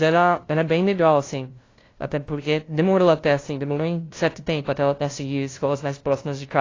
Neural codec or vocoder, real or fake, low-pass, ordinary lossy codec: codec, 16 kHz, 0.5 kbps, FunCodec, trained on LibriTTS, 25 frames a second; fake; 7.2 kHz; AAC, 32 kbps